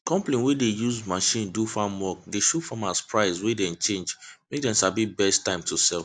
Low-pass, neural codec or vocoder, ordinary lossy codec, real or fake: 9.9 kHz; none; AAC, 64 kbps; real